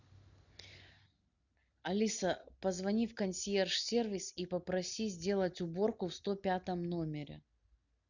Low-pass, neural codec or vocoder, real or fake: 7.2 kHz; none; real